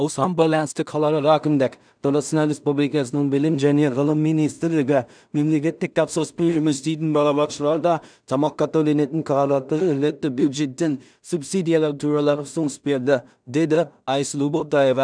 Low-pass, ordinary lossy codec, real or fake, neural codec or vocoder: 9.9 kHz; none; fake; codec, 16 kHz in and 24 kHz out, 0.4 kbps, LongCat-Audio-Codec, two codebook decoder